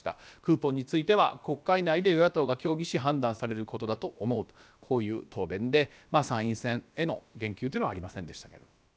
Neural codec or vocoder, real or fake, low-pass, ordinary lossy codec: codec, 16 kHz, about 1 kbps, DyCAST, with the encoder's durations; fake; none; none